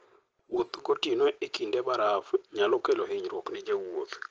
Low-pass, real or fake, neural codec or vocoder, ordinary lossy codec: 7.2 kHz; real; none; Opus, 16 kbps